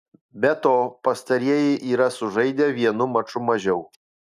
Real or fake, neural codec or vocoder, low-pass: real; none; 14.4 kHz